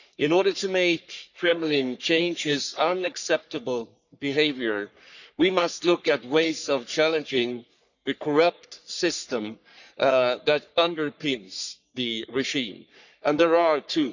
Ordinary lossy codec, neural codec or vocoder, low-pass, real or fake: none; codec, 44.1 kHz, 3.4 kbps, Pupu-Codec; 7.2 kHz; fake